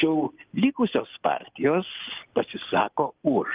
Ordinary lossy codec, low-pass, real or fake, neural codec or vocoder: Opus, 24 kbps; 3.6 kHz; real; none